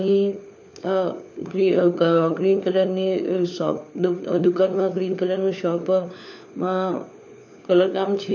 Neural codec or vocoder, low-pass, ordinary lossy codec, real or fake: codec, 16 kHz, 4 kbps, FunCodec, trained on LibriTTS, 50 frames a second; 7.2 kHz; none; fake